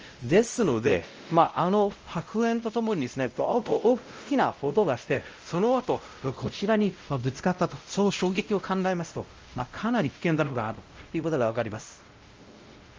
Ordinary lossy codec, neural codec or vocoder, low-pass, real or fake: Opus, 24 kbps; codec, 16 kHz, 0.5 kbps, X-Codec, WavLM features, trained on Multilingual LibriSpeech; 7.2 kHz; fake